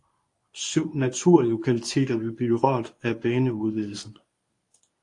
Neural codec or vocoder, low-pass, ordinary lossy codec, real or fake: codec, 24 kHz, 0.9 kbps, WavTokenizer, medium speech release version 1; 10.8 kHz; AAC, 48 kbps; fake